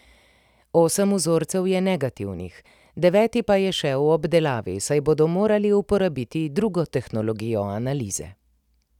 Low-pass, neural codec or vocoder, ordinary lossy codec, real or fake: 19.8 kHz; none; none; real